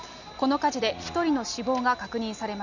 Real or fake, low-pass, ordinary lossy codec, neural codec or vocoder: real; 7.2 kHz; none; none